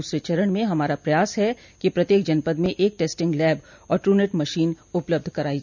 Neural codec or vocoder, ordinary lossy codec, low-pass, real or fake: none; none; 7.2 kHz; real